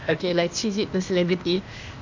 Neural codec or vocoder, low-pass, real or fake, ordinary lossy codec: codec, 16 kHz, 0.8 kbps, ZipCodec; 7.2 kHz; fake; MP3, 64 kbps